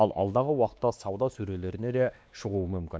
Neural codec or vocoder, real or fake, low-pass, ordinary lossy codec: codec, 16 kHz, 2 kbps, X-Codec, WavLM features, trained on Multilingual LibriSpeech; fake; none; none